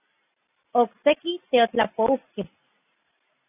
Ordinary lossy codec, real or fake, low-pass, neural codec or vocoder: AAC, 16 kbps; real; 3.6 kHz; none